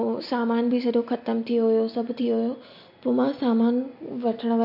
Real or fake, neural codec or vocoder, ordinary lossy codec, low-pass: real; none; MP3, 32 kbps; 5.4 kHz